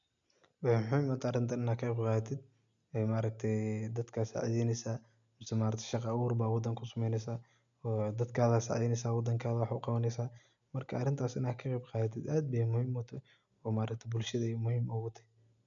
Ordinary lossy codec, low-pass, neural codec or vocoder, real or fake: none; 7.2 kHz; none; real